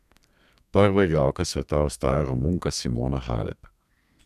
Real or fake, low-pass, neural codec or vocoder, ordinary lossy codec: fake; 14.4 kHz; codec, 32 kHz, 1.9 kbps, SNAC; none